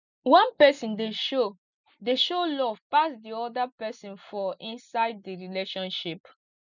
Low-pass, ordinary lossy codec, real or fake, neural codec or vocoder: 7.2 kHz; none; real; none